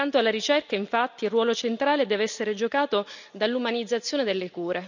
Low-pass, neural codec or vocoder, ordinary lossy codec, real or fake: 7.2 kHz; none; none; real